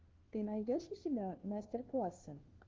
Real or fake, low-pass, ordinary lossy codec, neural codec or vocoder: fake; 7.2 kHz; Opus, 16 kbps; codec, 16 kHz, 1 kbps, FunCodec, trained on LibriTTS, 50 frames a second